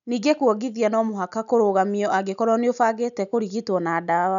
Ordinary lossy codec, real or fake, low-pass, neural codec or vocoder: none; real; 7.2 kHz; none